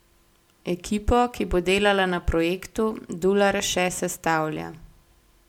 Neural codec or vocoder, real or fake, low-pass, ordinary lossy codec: none; real; 19.8 kHz; MP3, 96 kbps